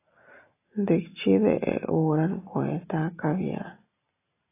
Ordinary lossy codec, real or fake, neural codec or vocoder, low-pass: AAC, 24 kbps; real; none; 3.6 kHz